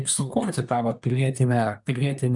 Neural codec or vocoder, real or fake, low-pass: codec, 24 kHz, 1 kbps, SNAC; fake; 10.8 kHz